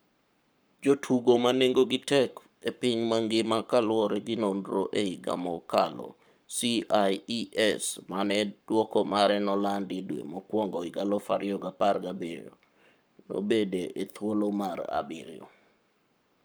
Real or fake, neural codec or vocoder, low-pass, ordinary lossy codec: fake; codec, 44.1 kHz, 7.8 kbps, Pupu-Codec; none; none